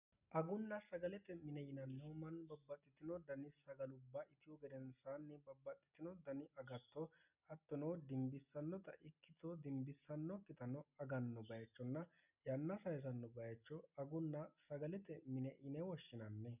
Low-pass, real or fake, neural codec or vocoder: 3.6 kHz; real; none